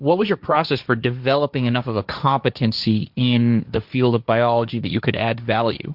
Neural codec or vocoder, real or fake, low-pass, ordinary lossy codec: codec, 16 kHz, 1.1 kbps, Voila-Tokenizer; fake; 5.4 kHz; Opus, 64 kbps